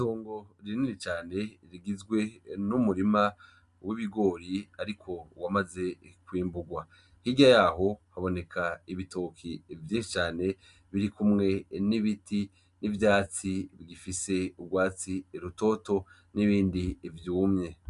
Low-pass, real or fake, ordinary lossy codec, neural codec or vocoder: 10.8 kHz; real; MP3, 96 kbps; none